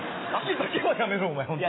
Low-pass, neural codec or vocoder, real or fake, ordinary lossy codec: 7.2 kHz; none; real; AAC, 16 kbps